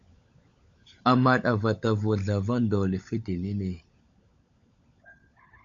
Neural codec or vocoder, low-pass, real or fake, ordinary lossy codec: codec, 16 kHz, 16 kbps, FunCodec, trained on LibriTTS, 50 frames a second; 7.2 kHz; fake; AAC, 64 kbps